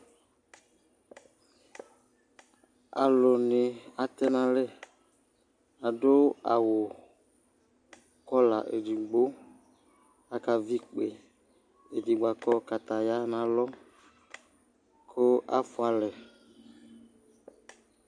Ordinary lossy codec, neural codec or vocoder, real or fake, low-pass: AAC, 64 kbps; none; real; 9.9 kHz